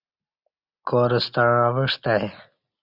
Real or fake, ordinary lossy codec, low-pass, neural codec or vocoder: real; Opus, 64 kbps; 5.4 kHz; none